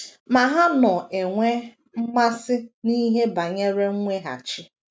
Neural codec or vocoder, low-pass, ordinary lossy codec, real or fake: none; none; none; real